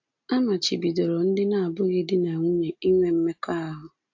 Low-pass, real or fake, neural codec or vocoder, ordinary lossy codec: 7.2 kHz; real; none; AAC, 48 kbps